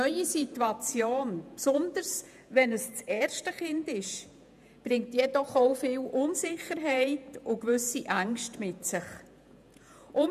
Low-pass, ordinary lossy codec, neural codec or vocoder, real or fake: 14.4 kHz; none; vocoder, 48 kHz, 128 mel bands, Vocos; fake